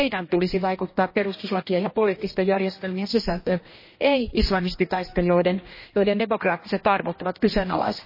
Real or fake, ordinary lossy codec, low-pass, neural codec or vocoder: fake; MP3, 24 kbps; 5.4 kHz; codec, 16 kHz, 1 kbps, X-Codec, HuBERT features, trained on general audio